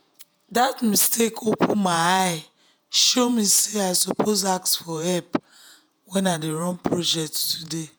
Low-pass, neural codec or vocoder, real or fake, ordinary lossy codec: none; vocoder, 48 kHz, 128 mel bands, Vocos; fake; none